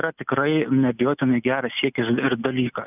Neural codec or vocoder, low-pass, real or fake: none; 3.6 kHz; real